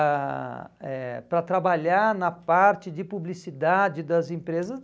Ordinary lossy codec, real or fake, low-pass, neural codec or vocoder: none; real; none; none